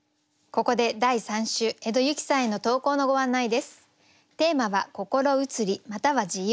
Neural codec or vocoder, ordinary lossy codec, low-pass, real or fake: none; none; none; real